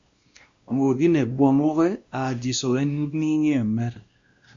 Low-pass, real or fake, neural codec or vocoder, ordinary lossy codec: 7.2 kHz; fake; codec, 16 kHz, 1 kbps, X-Codec, WavLM features, trained on Multilingual LibriSpeech; Opus, 64 kbps